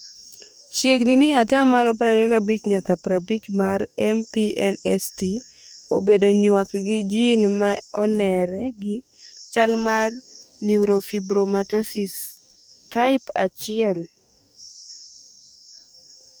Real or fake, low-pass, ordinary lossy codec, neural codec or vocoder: fake; none; none; codec, 44.1 kHz, 2.6 kbps, DAC